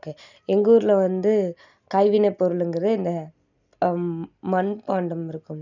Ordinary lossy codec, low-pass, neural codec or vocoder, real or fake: none; 7.2 kHz; none; real